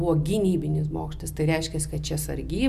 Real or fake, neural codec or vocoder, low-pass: real; none; 14.4 kHz